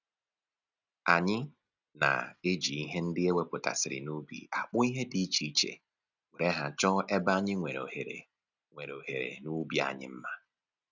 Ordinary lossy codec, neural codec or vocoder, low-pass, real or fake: none; none; 7.2 kHz; real